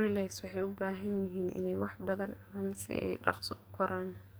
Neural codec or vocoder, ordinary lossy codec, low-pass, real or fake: codec, 44.1 kHz, 2.6 kbps, SNAC; none; none; fake